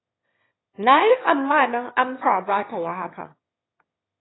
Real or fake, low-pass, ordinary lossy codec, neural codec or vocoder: fake; 7.2 kHz; AAC, 16 kbps; autoencoder, 22.05 kHz, a latent of 192 numbers a frame, VITS, trained on one speaker